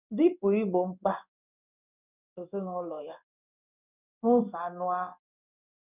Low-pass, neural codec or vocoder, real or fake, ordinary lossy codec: 3.6 kHz; codec, 16 kHz in and 24 kHz out, 1 kbps, XY-Tokenizer; fake; none